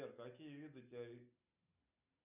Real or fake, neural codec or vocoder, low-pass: real; none; 3.6 kHz